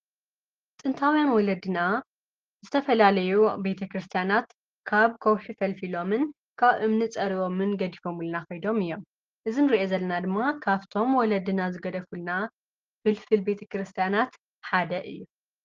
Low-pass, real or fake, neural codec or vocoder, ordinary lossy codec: 7.2 kHz; real; none; Opus, 16 kbps